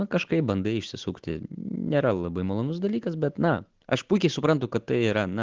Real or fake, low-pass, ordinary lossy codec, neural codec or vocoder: real; 7.2 kHz; Opus, 16 kbps; none